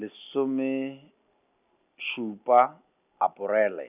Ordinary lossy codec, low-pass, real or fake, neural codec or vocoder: none; 3.6 kHz; real; none